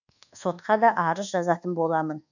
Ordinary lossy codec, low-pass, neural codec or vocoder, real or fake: none; 7.2 kHz; codec, 24 kHz, 1.2 kbps, DualCodec; fake